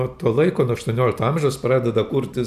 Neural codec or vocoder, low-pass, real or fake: none; 14.4 kHz; real